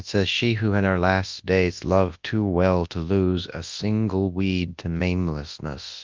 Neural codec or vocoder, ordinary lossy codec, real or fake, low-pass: codec, 24 kHz, 0.9 kbps, WavTokenizer, large speech release; Opus, 24 kbps; fake; 7.2 kHz